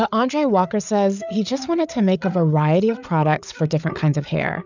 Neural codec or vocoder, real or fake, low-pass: codec, 16 kHz, 8 kbps, FreqCodec, larger model; fake; 7.2 kHz